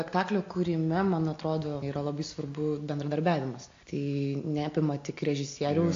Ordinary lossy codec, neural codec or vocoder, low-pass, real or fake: AAC, 48 kbps; none; 7.2 kHz; real